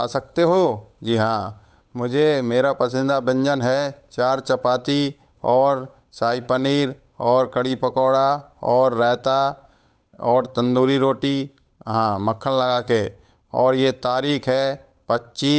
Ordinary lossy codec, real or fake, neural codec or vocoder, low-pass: none; fake; codec, 16 kHz, 8 kbps, FunCodec, trained on Chinese and English, 25 frames a second; none